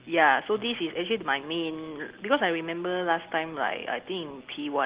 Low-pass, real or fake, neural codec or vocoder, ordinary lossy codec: 3.6 kHz; real; none; Opus, 16 kbps